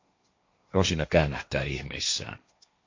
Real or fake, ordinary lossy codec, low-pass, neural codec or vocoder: fake; MP3, 48 kbps; 7.2 kHz; codec, 16 kHz, 1.1 kbps, Voila-Tokenizer